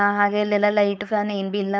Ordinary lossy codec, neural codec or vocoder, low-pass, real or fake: none; codec, 16 kHz, 4.8 kbps, FACodec; none; fake